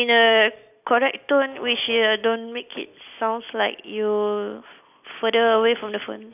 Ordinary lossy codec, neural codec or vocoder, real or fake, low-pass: none; none; real; 3.6 kHz